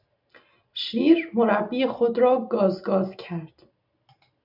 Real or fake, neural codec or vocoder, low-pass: real; none; 5.4 kHz